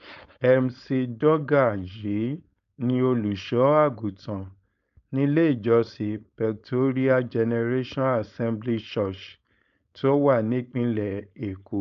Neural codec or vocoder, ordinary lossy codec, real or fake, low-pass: codec, 16 kHz, 4.8 kbps, FACodec; AAC, 96 kbps; fake; 7.2 kHz